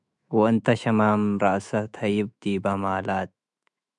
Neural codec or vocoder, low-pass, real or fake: autoencoder, 48 kHz, 128 numbers a frame, DAC-VAE, trained on Japanese speech; 10.8 kHz; fake